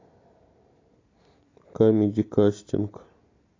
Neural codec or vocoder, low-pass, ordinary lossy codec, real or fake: none; 7.2 kHz; AAC, 32 kbps; real